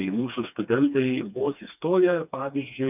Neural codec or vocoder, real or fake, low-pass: codec, 16 kHz, 2 kbps, FreqCodec, smaller model; fake; 3.6 kHz